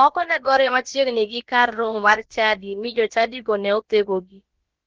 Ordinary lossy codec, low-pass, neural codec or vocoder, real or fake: Opus, 16 kbps; 7.2 kHz; codec, 16 kHz, about 1 kbps, DyCAST, with the encoder's durations; fake